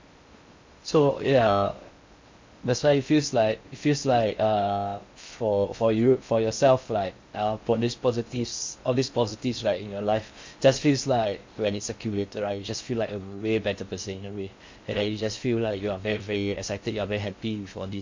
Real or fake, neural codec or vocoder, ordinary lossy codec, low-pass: fake; codec, 16 kHz in and 24 kHz out, 0.6 kbps, FocalCodec, streaming, 2048 codes; MP3, 48 kbps; 7.2 kHz